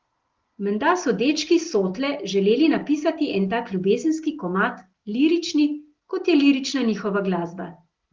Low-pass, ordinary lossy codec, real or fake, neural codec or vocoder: 7.2 kHz; Opus, 16 kbps; real; none